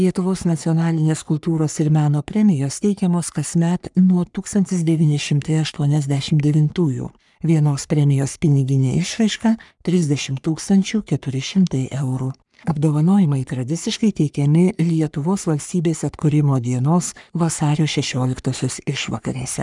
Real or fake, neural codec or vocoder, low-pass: fake; codec, 44.1 kHz, 3.4 kbps, Pupu-Codec; 10.8 kHz